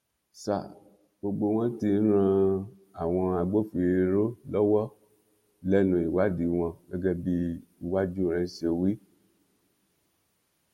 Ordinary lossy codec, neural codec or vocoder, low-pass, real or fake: MP3, 64 kbps; none; 19.8 kHz; real